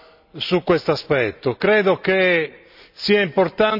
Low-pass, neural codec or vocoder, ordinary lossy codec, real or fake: 5.4 kHz; none; none; real